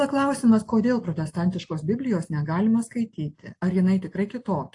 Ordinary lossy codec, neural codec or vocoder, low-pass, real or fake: AAC, 48 kbps; none; 10.8 kHz; real